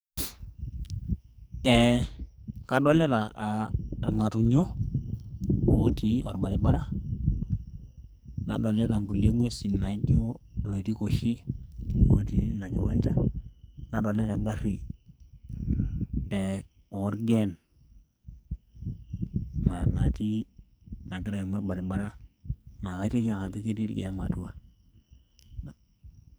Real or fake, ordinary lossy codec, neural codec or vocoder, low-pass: fake; none; codec, 44.1 kHz, 2.6 kbps, SNAC; none